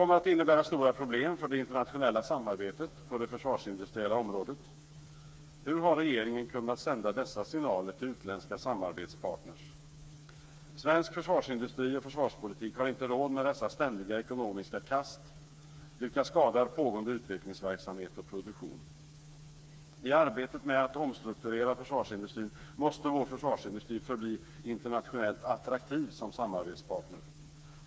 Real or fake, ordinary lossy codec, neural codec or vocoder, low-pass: fake; none; codec, 16 kHz, 4 kbps, FreqCodec, smaller model; none